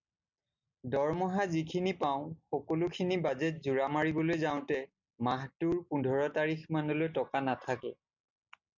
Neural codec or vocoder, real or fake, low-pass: none; real; 7.2 kHz